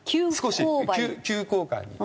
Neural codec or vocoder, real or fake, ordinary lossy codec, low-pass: none; real; none; none